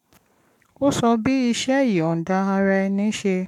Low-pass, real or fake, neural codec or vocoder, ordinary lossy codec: 19.8 kHz; fake; codec, 44.1 kHz, 7.8 kbps, Pupu-Codec; none